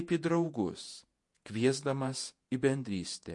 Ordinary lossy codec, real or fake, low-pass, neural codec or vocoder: MP3, 48 kbps; fake; 10.8 kHz; vocoder, 48 kHz, 128 mel bands, Vocos